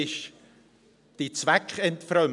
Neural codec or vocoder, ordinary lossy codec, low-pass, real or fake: none; none; 10.8 kHz; real